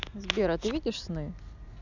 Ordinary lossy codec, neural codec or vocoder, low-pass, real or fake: none; none; 7.2 kHz; real